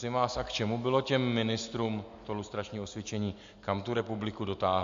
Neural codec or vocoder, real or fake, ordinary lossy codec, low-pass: none; real; MP3, 64 kbps; 7.2 kHz